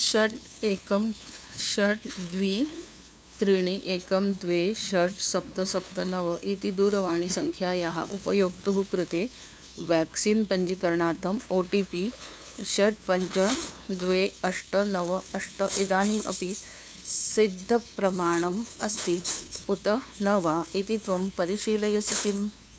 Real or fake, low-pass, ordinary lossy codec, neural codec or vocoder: fake; none; none; codec, 16 kHz, 2 kbps, FunCodec, trained on LibriTTS, 25 frames a second